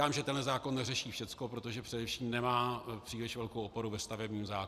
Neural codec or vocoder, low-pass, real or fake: none; 14.4 kHz; real